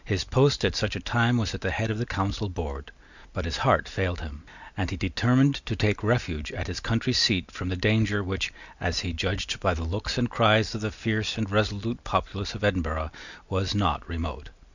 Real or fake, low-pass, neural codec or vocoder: real; 7.2 kHz; none